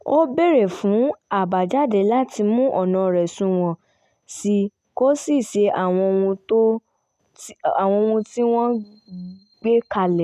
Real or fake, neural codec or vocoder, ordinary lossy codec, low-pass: real; none; none; 14.4 kHz